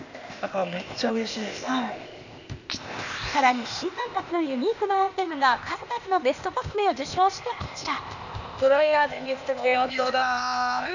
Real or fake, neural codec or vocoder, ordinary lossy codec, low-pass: fake; codec, 16 kHz, 0.8 kbps, ZipCodec; none; 7.2 kHz